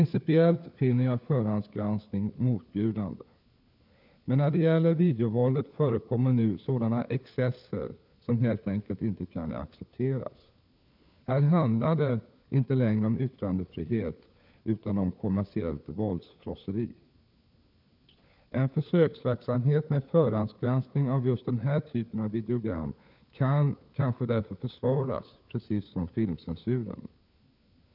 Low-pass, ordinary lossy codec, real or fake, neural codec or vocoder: 5.4 kHz; none; fake; codec, 16 kHz, 4 kbps, FunCodec, trained on LibriTTS, 50 frames a second